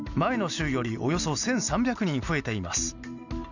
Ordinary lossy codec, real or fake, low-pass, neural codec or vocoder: none; real; 7.2 kHz; none